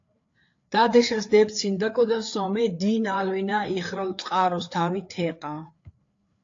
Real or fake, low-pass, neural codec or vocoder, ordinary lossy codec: fake; 7.2 kHz; codec, 16 kHz, 4 kbps, FreqCodec, larger model; AAC, 48 kbps